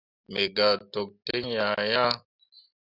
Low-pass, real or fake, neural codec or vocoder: 5.4 kHz; real; none